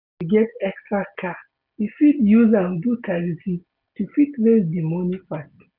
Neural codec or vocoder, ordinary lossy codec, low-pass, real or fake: none; none; 5.4 kHz; real